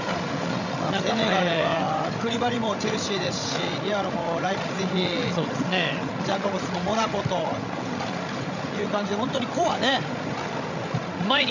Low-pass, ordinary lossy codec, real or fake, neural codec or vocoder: 7.2 kHz; none; fake; codec, 16 kHz, 16 kbps, FreqCodec, larger model